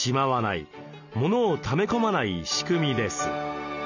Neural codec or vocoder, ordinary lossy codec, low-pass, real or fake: none; none; 7.2 kHz; real